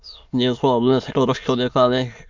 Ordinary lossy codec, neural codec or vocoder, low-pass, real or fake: MP3, 64 kbps; autoencoder, 22.05 kHz, a latent of 192 numbers a frame, VITS, trained on many speakers; 7.2 kHz; fake